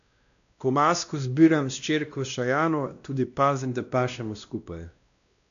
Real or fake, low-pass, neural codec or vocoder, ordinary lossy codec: fake; 7.2 kHz; codec, 16 kHz, 1 kbps, X-Codec, WavLM features, trained on Multilingual LibriSpeech; none